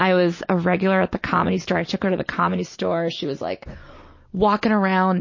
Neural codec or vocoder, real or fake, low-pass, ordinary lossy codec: none; real; 7.2 kHz; MP3, 32 kbps